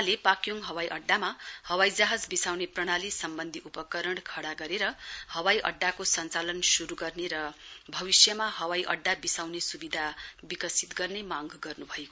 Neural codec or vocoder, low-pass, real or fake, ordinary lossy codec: none; none; real; none